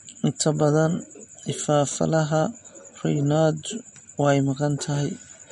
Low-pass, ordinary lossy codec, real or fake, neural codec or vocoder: 19.8 kHz; MP3, 48 kbps; real; none